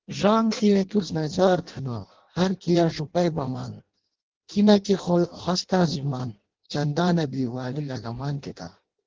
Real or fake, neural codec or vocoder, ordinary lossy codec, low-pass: fake; codec, 16 kHz in and 24 kHz out, 0.6 kbps, FireRedTTS-2 codec; Opus, 16 kbps; 7.2 kHz